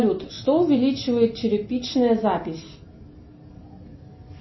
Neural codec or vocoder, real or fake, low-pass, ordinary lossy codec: none; real; 7.2 kHz; MP3, 24 kbps